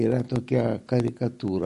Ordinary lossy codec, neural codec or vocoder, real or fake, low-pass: MP3, 48 kbps; none; real; 14.4 kHz